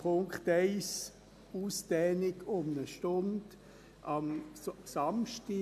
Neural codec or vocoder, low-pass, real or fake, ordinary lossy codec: none; 14.4 kHz; real; AAC, 96 kbps